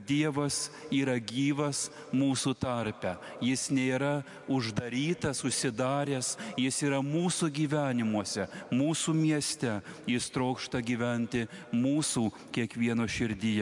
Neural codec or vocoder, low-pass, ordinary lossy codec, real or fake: none; 10.8 kHz; MP3, 64 kbps; real